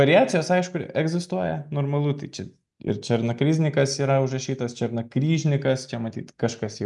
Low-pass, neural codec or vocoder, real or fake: 10.8 kHz; none; real